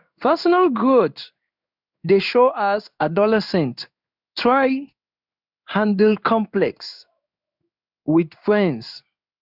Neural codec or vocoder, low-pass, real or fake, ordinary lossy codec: codec, 16 kHz in and 24 kHz out, 1 kbps, XY-Tokenizer; 5.4 kHz; fake; none